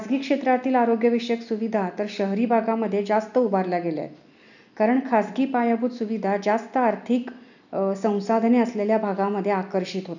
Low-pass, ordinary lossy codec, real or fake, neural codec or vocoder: 7.2 kHz; none; real; none